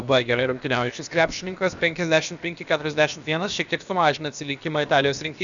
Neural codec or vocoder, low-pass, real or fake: codec, 16 kHz, about 1 kbps, DyCAST, with the encoder's durations; 7.2 kHz; fake